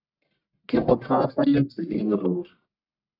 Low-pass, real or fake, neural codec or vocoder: 5.4 kHz; fake; codec, 44.1 kHz, 1.7 kbps, Pupu-Codec